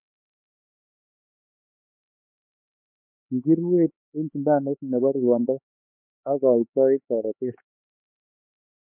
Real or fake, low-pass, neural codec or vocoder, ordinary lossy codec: fake; 3.6 kHz; codec, 16 kHz, 4 kbps, X-Codec, HuBERT features, trained on LibriSpeech; MP3, 32 kbps